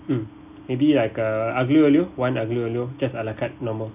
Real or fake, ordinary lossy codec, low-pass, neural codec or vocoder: real; none; 3.6 kHz; none